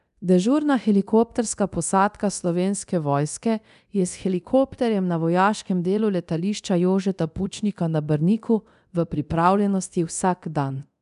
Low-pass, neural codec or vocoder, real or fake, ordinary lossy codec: 10.8 kHz; codec, 24 kHz, 0.9 kbps, DualCodec; fake; none